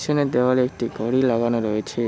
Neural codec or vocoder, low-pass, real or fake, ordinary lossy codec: none; none; real; none